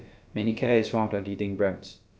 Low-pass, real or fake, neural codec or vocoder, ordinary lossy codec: none; fake; codec, 16 kHz, about 1 kbps, DyCAST, with the encoder's durations; none